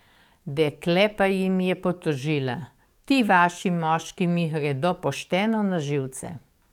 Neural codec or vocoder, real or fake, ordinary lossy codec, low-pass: codec, 44.1 kHz, 7.8 kbps, DAC; fake; none; 19.8 kHz